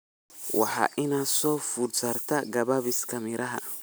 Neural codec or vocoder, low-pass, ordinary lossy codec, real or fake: none; none; none; real